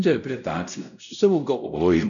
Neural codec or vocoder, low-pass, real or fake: codec, 16 kHz, 0.5 kbps, X-Codec, WavLM features, trained on Multilingual LibriSpeech; 7.2 kHz; fake